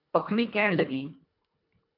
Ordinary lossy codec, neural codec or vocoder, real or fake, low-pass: MP3, 48 kbps; codec, 24 kHz, 1.5 kbps, HILCodec; fake; 5.4 kHz